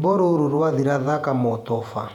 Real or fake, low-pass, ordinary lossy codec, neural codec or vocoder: fake; 19.8 kHz; none; vocoder, 48 kHz, 128 mel bands, Vocos